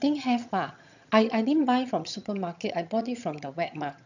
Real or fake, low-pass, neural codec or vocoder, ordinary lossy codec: fake; 7.2 kHz; vocoder, 22.05 kHz, 80 mel bands, HiFi-GAN; none